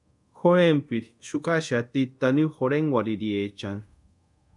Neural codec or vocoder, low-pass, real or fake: codec, 24 kHz, 0.5 kbps, DualCodec; 10.8 kHz; fake